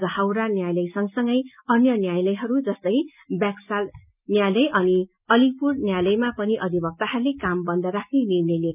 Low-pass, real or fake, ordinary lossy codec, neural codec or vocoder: 3.6 kHz; real; none; none